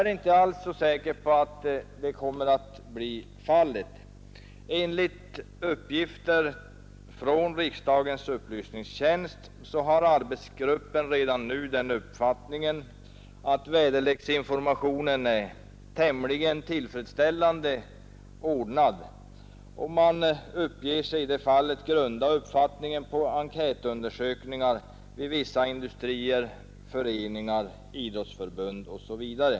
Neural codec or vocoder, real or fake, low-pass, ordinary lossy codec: none; real; none; none